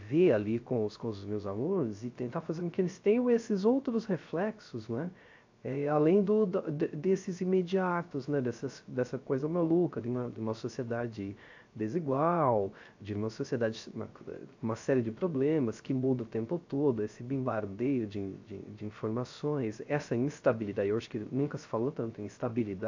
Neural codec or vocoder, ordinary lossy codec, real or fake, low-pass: codec, 16 kHz, 0.3 kbps, FocalCodec; AAC, 48 kbps; fake; 7.2 kHz